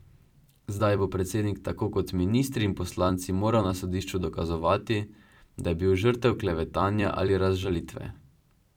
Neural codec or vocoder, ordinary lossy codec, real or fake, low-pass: vocoder, 44.1 kHz, 128 mel bands every 256 samples, BigVGAN v2; none; fake; 19.8 kHz